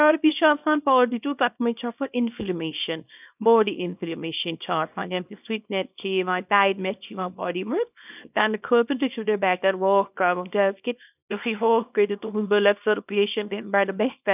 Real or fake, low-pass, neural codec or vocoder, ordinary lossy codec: fake; 3.6 kHz; codec, 24 kHz, 0.9 kbps, WavTokenizer, small release; none